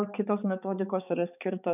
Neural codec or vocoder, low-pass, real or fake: codec, 16 kHz, 4 kbps, X-Codec, HuBERT features, trained on balanced general audio; 3.6 kHz; fake